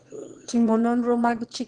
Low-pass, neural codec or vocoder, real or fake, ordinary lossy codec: 9.9 kHz; autoencoder, 22.05 kHz, a latent of 192 numbers a frame, VITS, trained on one speaker; fake; Opus, 16 kbps